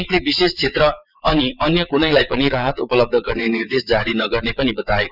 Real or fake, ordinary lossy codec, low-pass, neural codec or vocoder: fake; none; 5.4 kHz; vocoder, 22.05 kHz, 80 mel bands, WaveNeXt